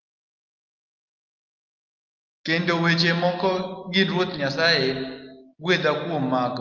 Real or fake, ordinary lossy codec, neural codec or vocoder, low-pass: real; Opus, 24 kbps; none; 7.2 kHz